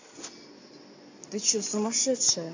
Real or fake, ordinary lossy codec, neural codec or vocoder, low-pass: real; AAC, 48 kbps; none; 7.2 kHz